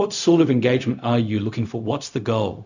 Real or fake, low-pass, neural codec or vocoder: fake; 7.2 kHz; codec, 16 kHz, 0.4 kbps, LongCat-Audio-Codec